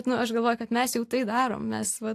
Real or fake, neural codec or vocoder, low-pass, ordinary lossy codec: real; none; 14.4 kHz; AAC, 64 kbps